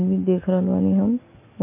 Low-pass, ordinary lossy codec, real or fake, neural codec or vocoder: 3.6 kHz; MP3, 32 kbps; real; none